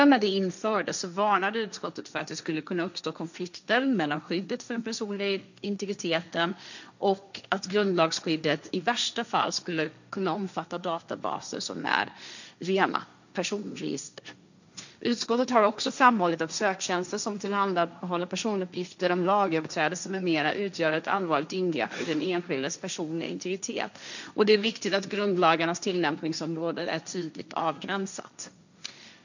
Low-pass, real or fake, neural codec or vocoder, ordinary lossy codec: 7.2 kHz; fake; codec, 16 kHz, 1.1 kbps, Voila-Tokenizer; none